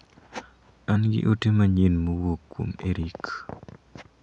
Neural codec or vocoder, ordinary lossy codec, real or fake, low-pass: none; none; real; 10.8 kHz